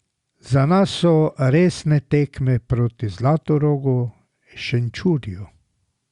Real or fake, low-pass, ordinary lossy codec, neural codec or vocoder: real; 10.8 kHz; Opus, 64 kbps; none